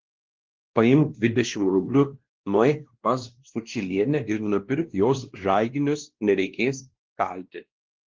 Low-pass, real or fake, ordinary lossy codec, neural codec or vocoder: 7.2 kHz; fake; Opus, 16 kbps; codec, 16 kHz, 1 kbps, X-Codec, WavLM features, trained on Multilingual LibriSpeech